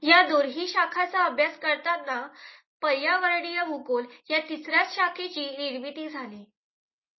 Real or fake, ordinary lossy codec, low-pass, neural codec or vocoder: real; MP3, 24 kbps; 7.2 kHz; none